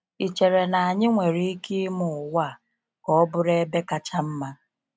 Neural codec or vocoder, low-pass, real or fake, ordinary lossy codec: none; none; real; none